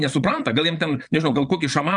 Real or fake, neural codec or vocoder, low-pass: fake; vocoder, 22.05 kHz, 80 mel bands, Vocos; 9.9 kHz